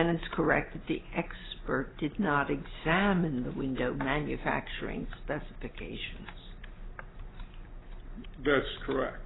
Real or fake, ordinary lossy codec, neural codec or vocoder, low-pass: real; AAC, 16 kbps; none; 7.2 kHz